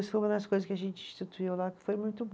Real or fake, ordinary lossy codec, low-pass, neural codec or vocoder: real; none; none; none